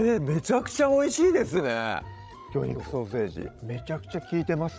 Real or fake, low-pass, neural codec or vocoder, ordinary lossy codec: fake; none; codec, 16 kHz, 16 kbps, FreqCodec, larger model; none